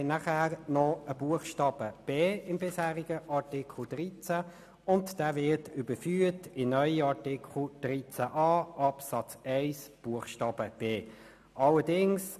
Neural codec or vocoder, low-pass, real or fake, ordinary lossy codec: none; 14.4 kHz; real; none